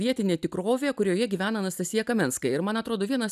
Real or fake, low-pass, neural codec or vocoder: real; 14.4 kHz; none